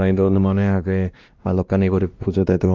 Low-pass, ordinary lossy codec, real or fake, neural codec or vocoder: 7.2 kHz; Opus, 24 kbps; fake; codec, 16 kHz, 1 kbps, X-Codec, WavLM features, trained on Multilingual LibriSpeech